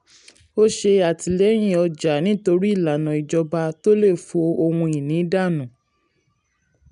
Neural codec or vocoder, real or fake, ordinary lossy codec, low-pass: none; real; none; 10.8 kHz